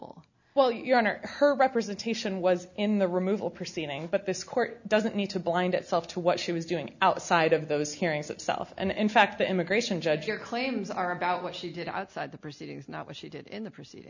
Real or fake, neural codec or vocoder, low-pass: real; none; 7.2 kHz